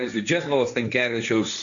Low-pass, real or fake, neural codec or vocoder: 7.2 kHz; fake; codec, 16 kHz, 1.1 kbps, Voila-Tokenizer